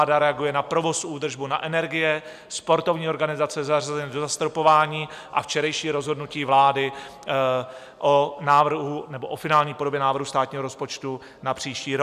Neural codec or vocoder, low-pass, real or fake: none; 14.4 kHz; real